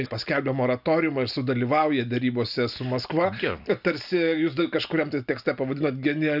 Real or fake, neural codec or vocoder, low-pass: real; none; 5.4 kHz